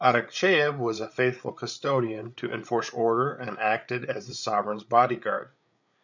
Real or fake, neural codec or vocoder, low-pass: fake; codec, 16 kHz, 8 kbps, FreqCodec, larger model; 7.2 kHz